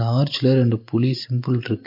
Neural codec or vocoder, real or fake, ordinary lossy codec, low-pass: none; real; MP3, 32 kbps; 5.4 kHz